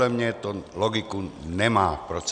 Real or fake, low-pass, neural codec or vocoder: real; 9.9 kHz; none